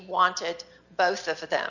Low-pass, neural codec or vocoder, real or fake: 7.2 kHz; none; real